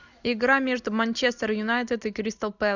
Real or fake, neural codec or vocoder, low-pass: real; none; 7.2 kHz